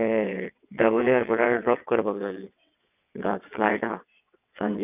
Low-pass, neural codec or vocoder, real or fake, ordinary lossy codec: 3.6 kHz; vocoder, 22.05 kHz, 80 mel bands, WaveNeXt; fake; none